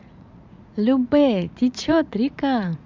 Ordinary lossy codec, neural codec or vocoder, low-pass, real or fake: MP3, 64 kbps; vocoder, 44.1 kHz, 80 mel bands, Vocos; 7.2 kHz; fake